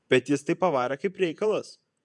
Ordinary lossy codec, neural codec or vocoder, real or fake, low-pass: MP3, 96 kbps; vocoder, 48 kHz, 128 mel bands, Vocos; fake; 10.8 kHz